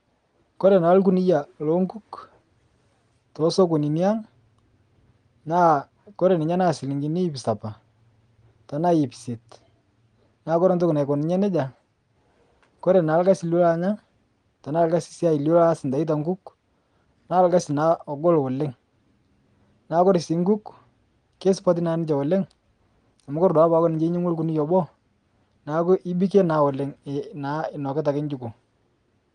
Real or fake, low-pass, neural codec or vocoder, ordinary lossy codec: real; 9.9 kHz; none; Opus, 24 kbps